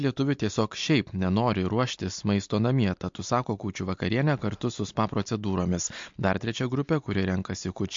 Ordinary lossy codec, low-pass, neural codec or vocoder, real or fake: MP3, 48 kbps; 7.2 kHz; none; real